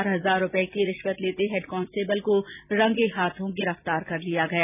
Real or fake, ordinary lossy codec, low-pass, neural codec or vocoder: real; none; 3.6 kHz; none